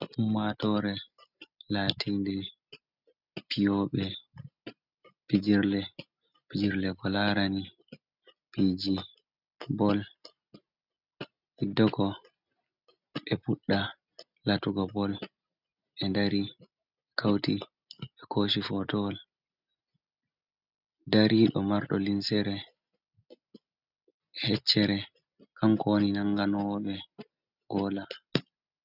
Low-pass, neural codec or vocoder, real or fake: 5.4 kHz; none; real